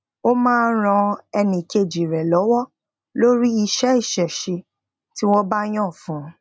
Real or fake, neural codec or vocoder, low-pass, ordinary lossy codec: real; none; none; none